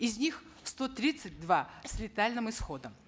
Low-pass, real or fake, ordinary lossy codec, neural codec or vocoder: none; real; none; none